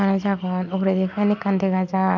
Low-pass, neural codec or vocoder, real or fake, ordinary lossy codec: 7.2 kHz; none; real; MP3, 64 kbps